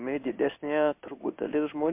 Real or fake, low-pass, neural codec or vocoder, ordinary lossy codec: fake; 3.6 kHz; codec, 16 kHz in and 24 kHz out, 1 kbps, XY-Tokenizer; MP3, 32 kbps